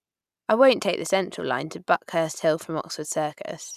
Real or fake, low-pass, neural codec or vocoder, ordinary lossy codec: real; 14.4 kHz; none; none